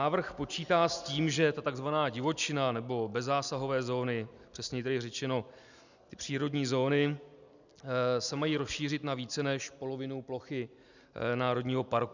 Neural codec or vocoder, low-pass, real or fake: none; 7.2 kHz; real